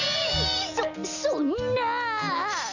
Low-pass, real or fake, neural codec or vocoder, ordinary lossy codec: 7.2 kHz; real; none; none